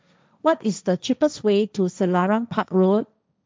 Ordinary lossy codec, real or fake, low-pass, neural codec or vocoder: none; fake; none; codec, 16 kHz, 1.1 kbps, Voila-Tokenizer